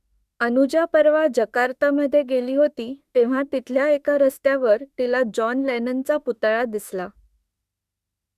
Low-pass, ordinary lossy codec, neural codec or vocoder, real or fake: 14.4 kHz; Opus, 64 kbps; autoencoder, 48 kHz, 32 numbers a frame, DAC-VAE, trained on Japanese speech; fake